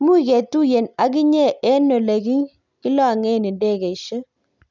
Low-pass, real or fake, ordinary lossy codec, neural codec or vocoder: 7.2 kHz; real; none; none